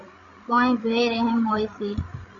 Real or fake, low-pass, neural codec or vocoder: fake; 7.2 kHz; codec, 16 kHz, 16 kbps, FreqCodec, larger model